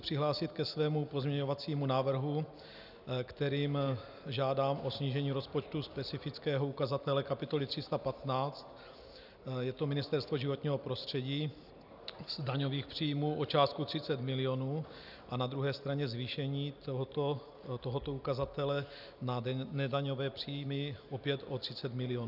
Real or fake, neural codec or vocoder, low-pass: real; none; 5.4 kHz